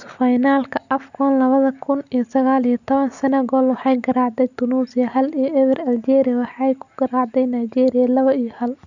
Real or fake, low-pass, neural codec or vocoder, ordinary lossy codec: real; 7.2 kHz; none; none